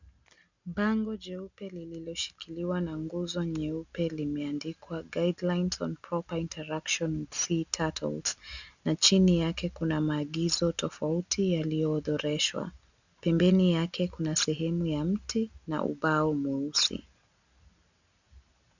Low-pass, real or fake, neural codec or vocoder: 7.2 kHz; real; none